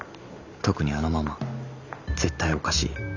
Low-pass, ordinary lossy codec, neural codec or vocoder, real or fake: 7.2 kHz; none; none; real